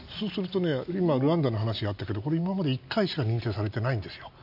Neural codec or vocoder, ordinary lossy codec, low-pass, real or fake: none; AAC, 48 kbps; 5.4 kHz; real